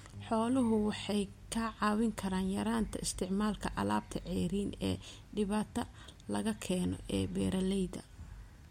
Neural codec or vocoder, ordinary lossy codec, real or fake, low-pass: none; MP3, 64 kbps; real; 19.8 kHz